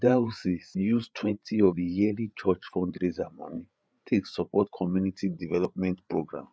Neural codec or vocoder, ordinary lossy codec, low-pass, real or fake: codec, 16 kHz, 8 kbps, FreqCodec, larger model; none; none; fake